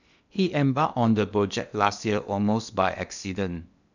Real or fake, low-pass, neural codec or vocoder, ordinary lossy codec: fake; 7.2 kHz; codec, 16 kHz in and 24 kHz out, 0.8 kbps, FocalCodec, streaming, 65536 codes; none